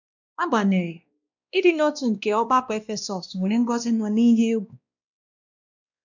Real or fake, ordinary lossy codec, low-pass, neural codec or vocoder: fake; none; 7.2 kHz; codec, 16 kHz, 1 kbps, X-Codec, WavLM features, trained on Multilingual LibriSpeech